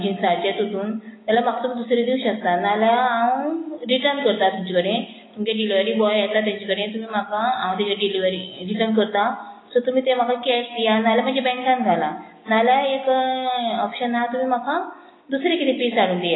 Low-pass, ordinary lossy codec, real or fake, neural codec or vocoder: 7.2 kHz; AAC, 16 kbps; real; none